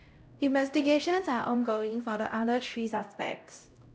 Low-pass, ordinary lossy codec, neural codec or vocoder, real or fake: none; none; codec, 16 kHz, 0.5 kbps, X-Codec, HuBERT features, trained on LibriSpeech; fake